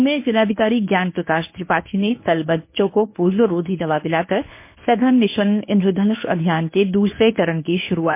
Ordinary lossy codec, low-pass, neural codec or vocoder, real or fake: MP3, 24 kbps; 3.6 kHz; codec, 24 kHz, 0.9 kbps, WavTokenizer, medium speech release version 1; fake